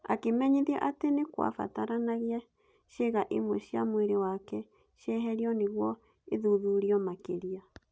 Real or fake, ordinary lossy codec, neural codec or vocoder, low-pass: real; none; none; none